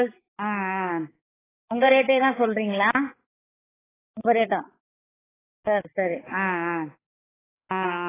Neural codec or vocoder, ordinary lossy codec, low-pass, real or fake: codec, 16 kHz, 4 kbps, X-Codec, HuBERT features, trained on balanced general audio; AAC, 16 kbps; 3.6 kHz; fake